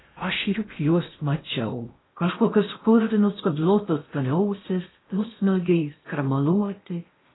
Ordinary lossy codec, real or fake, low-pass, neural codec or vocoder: AAC, 16 kbps; fake; 7.2 kHz; codec, 16 kHz in and 24 kHz out, 0.6 kbps, FocalCodec, streaming, 4096 codes